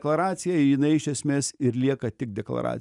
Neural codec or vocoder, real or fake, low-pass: none; real; 10.8 kHz